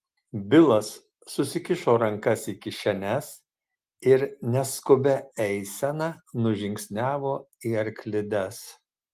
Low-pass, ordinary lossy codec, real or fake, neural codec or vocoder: 14.4 kHz; Opus, 24 kbps; real; none